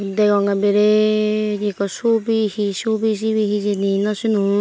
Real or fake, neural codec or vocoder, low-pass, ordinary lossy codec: real; none; none; none